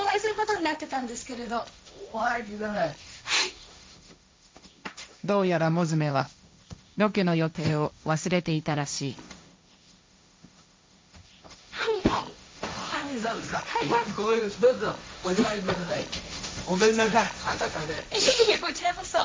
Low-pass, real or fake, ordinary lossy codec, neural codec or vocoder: none; fake; none; codec, 16 kHz, 1.1 kbps, Voila-Tokenizer